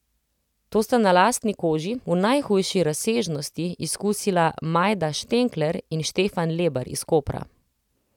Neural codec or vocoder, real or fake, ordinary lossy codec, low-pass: none; real; none; 19.8 kHz